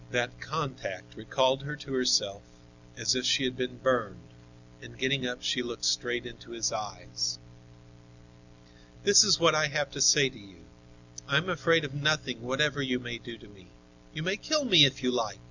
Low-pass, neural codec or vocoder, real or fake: 7.2 kHz; none; real